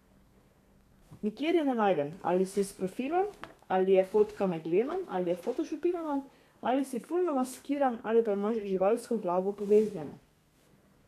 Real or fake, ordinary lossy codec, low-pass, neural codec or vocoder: fake; none; 14.4 kHz; codec, 32 kHz, 1.9 kbps, SNAC